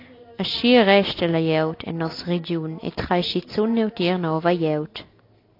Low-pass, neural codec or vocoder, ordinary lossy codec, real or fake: 5.4 kHz; none; AAC, 32 kbps; real